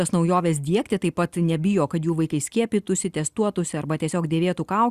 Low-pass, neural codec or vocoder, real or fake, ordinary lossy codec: 14.4 kHz; none; real; Opus, 64 kbps